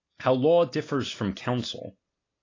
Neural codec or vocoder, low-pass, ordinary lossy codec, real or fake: none; 7.2 kHz; AAC, 32 kbps; real